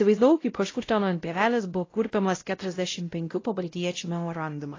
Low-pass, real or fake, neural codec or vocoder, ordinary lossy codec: 7.2 kHz; fake; codec, 16 kHz, 0.5 kbps, X-Codec, WavLM features, trained on Multilingual LibriSpeech; AAC, 32 kbps